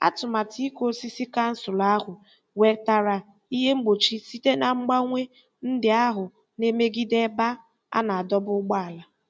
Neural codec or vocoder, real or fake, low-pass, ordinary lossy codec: none; real; none; none